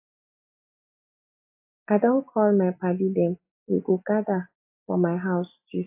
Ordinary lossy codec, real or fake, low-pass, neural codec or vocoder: AAC, 32 kbps; real; 3.6 kHz; none